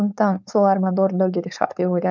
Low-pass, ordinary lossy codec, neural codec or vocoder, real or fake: none; none; codec, 16 kHz, 4.8 kbps, FACodec; fake